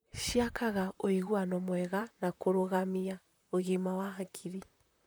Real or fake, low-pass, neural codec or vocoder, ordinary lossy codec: fake; none; vocoder, 44.1 kHz, 128 mel bands, Pupu-Vocoder; none